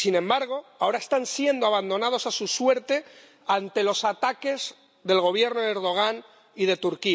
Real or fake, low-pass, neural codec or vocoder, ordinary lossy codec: real; none; none; none